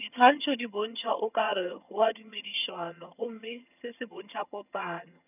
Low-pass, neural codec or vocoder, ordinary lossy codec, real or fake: 3.6 kHz; vocoder, 22.05 kHz, 80 mel bands, HiFi-GAN; none; fake